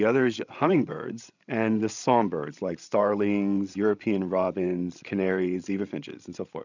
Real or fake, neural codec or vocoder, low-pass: fake; codec, 16 kHz, 16 kbps, FreqCodec, larger model; 7.2 kHz